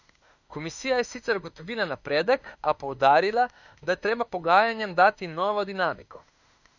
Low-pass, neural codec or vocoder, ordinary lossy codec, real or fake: 7.2 kHz; autoencoder, 48 kHz, 32 numbers a frame, DAC-VAE, trained on Japanese speech; none; fake